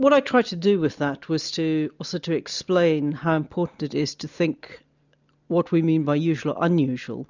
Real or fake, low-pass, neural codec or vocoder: real; 7.2 kHz; none